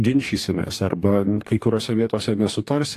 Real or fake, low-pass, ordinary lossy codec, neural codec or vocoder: fake; 14.4 kHz; AAC, 64 kbps; codec, 44.1 kHz, 2.6 kbps, DAC